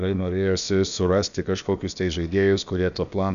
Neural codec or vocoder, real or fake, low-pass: codec, 16 kHz, about 1 kbps, DyCAST, with the encoder's durations; fake; 7.2 kHz